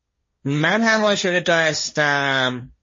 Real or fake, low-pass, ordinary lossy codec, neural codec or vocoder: fake; 7.2 kHz; MP3, 32 kbps; codec, 16 kHz, 1.1 kbps, Voila-Tokenizer